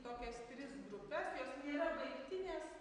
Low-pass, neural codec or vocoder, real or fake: 9.9 kHz; none; real